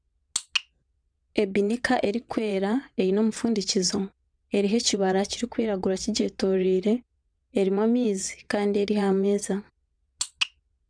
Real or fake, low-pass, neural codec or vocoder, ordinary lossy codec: fake; 9.9 kHz; vocoder, 22.05 kHz, 80 mel bands, WaveNeXt; none